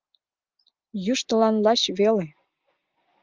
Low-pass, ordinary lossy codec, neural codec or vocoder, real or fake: 7.2 kHz; Opus, 24 kbps; none; real